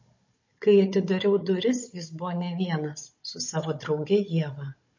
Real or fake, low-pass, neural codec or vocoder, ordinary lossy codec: fake; 7.2 kHz; codec, 16 kHz, 16 kbps, FunCodec, trained on Chinese and English, 50 frames a second; MP3, 32 kbps